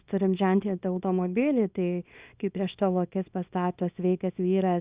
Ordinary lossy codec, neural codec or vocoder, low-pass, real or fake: Opus, 64 kbps; codec, 24 kHz, 0.9 kbps, WavTokenizer, small release; 3.6 kHz; fake